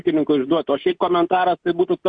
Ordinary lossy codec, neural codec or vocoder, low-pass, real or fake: MP3, 64 kbps; none; 9.9 kHz; real